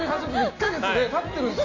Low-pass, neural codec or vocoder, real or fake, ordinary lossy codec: 7.2 kHz; none; real; AAC, 48 kbps